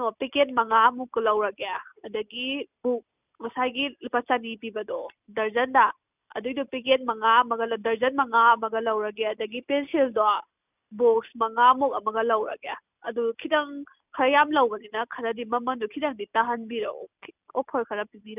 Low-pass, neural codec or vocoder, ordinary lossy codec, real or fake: 3.6 kHz; none; none; real